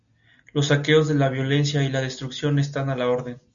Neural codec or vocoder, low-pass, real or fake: none; 7.2 kHz; real